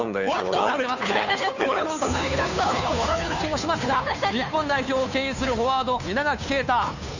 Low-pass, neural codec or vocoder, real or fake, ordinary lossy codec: 7.2 kHz; codec, 16 kHz, 2 kbps, FunCodec, trained on Chinese and English, 25 frames a second; fake; none